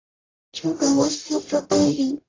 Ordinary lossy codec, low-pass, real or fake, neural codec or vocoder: AAC, 32 kbps; 7.2 kHz; fake; codec, 44.1 kHz, 0.9 kbps, DAC